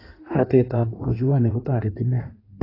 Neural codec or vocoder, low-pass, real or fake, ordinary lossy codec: codec, 16 kHz in and 24 kHz out, 1.1 kbps, FireRedTTS-2 codec; 5.4 kHz; fake; AAC, 24 kbps